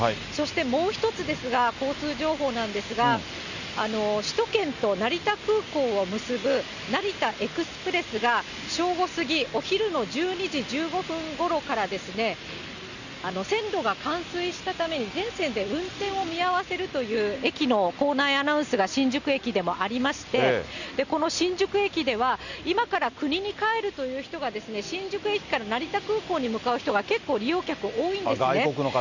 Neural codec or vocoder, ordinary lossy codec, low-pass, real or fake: none; none; 7.2 kHz; real